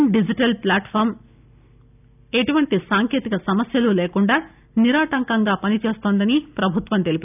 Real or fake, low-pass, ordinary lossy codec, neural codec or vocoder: real; 3.6 kHz; none; none